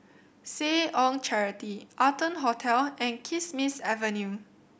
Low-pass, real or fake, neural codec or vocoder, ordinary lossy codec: none; real; none; none